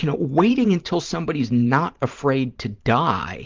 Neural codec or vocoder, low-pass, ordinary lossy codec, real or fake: vocoder, 44.1 kHz, 128 mel bands every 512 samples, BigVGAN v2; 7.2 kHz; Opus, 32 kbps; fake